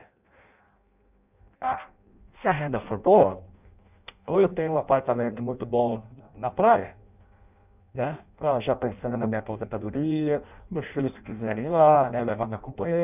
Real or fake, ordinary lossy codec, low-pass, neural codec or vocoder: fake; none; 3.6 kHz; codec, 16 kHz in and 24 kHz out, 0.6 kbps, FireRedTTS-2 codec